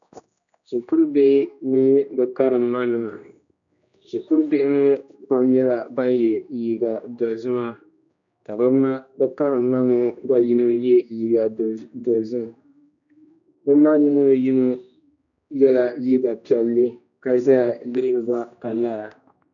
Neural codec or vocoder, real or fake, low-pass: codec, 16 kHz, 1 kbps, X-Codec, HuBERT features, trained on general audio; fake; 7.2 kHz